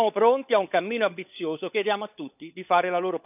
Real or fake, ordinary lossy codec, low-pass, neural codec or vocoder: fake; none; 3.6 kHz; codec, 16 kHz, 16 kbps, FunCodec, trained on LibriTTS, 50 frames a second